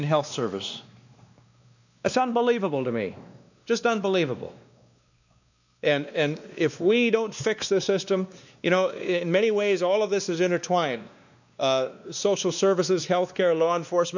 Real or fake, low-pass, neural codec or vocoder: fake; 7.2 kHz; codec, 16 kHz, 2 kbps, X-Codec, WavLM features, trained on Multilingual LibriSpeech